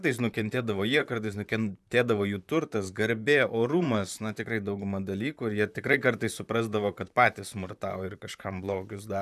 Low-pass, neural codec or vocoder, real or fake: 14.4 kHz; vocoder, 44.1 kHz, 128 mel bands, Pupu-Vocoder; fake